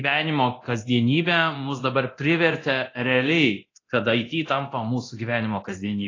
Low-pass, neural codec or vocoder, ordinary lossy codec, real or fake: 7.2 kHz; codec, 24 kHz, 0.9 kbps, DualCodec; AAC, 32 kbps; fake